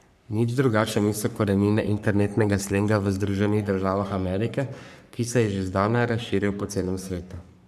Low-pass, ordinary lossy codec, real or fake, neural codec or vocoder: 14.4 kHz; none; fake; codec, 44.1 kHz, 3.4 kbps, Pupu-Codec